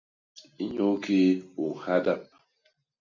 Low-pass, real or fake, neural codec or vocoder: 7.2 kHz; real; none